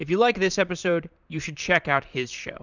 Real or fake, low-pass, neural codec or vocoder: fake; 7.2 kHz; vocoder, 44.1 kHz, 128 mel bands, Pupu-Vocoder